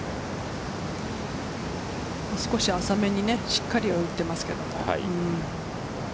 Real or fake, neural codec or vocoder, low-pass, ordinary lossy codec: real; none; none; none